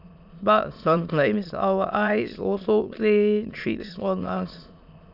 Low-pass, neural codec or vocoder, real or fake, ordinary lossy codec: 5.4 kHz; autoencoder, 22.05 kHz, a latent of 192 numbers a frame, VITS, trained on many speakers; fake; none